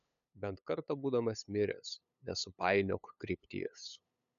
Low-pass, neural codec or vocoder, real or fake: 7.2 kHz; codec, 16 kHz, 8 kbps, FunCodec, trained on LibriTTS, 25 frames a second; fake